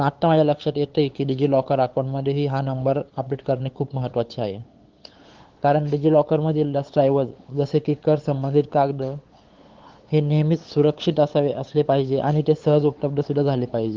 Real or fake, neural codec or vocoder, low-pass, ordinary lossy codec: fake; codec, 16 kHz, 8 kbps, FunCodec, trained on LibriTTS, 25 frames a second; 7.2 kHz; Opus, 16 kbps